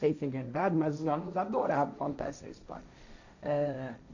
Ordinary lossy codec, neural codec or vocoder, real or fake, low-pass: none; codec, 16 kHz, 1.1 kbps, Voila-Tokenizer; fake; 7.2 kHz